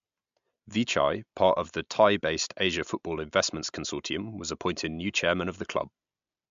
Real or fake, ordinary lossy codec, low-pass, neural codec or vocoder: real; MP3, 64 kbps; 7.2 kHz; none